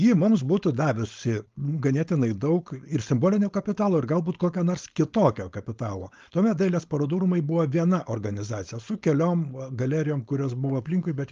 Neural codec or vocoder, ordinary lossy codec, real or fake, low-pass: codec, 16 kHz, 4.8 kbps, FACodec; Opus, 32 kbps; fake; 7.2 kHz